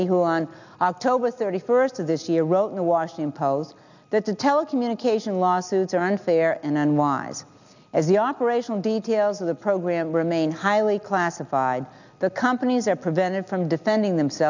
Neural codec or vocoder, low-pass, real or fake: none; 7.2 kHz; real